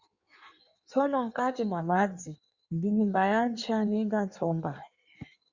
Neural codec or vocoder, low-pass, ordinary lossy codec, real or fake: codec, 16 kHz in and 24 kHz out, 1.1 kbps, FireRedTTS-2 codec; 7.2 kHz; Opus, 64 kbps; fake